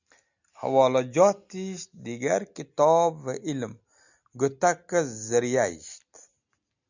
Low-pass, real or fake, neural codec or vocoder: 7.2 kHz; real; none